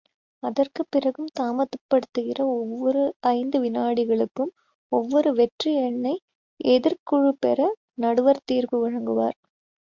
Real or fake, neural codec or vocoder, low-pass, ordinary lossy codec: real; none; 7.2 kHz; MP3, 64 kbps